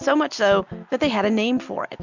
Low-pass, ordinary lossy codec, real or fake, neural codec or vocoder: 7.2 kHz; MP3, 64 kbps; real; none